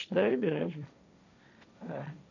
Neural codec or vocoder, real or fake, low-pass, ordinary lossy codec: codec, 16 kHz, 1.1 kbps, Voila-Tokenizer; fake; 7.2 kHz; none